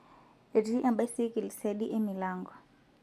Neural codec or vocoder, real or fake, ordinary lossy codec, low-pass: none; real; none; 14.4 kHz